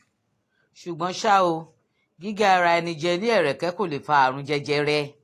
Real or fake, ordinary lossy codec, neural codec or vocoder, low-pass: real; AAC, 48 kbps; none; 14.4 kHz